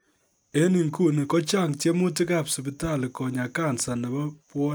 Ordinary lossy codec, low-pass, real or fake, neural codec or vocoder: none; none; real; none